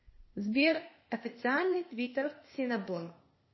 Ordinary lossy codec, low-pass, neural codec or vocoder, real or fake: MP3, 24 kbps; 7.2 kHz; codec, 16 kHz, 0.8 kbps, ZipCodec; fake